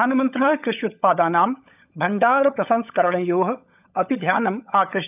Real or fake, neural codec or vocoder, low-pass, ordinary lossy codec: fake; codec, 16 kHz, 16 kbps, FunCodec, trained on LibriTTS, 50 frames a second; 3.6 kHz; none